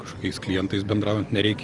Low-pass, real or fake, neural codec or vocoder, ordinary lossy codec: 10.8 kHz; real; none; Opus, 24 kbps